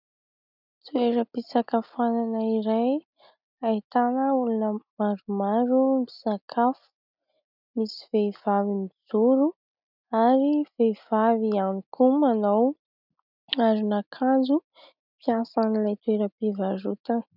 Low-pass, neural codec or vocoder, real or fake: 5.4 kHz; none; real